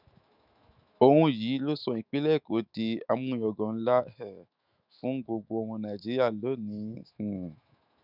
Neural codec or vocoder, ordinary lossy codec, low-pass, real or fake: autoencoder, 48 kHz, 128 numbers a frame, DAC-VAE, trained on Japanese speech; none; 5.4 kHz; fake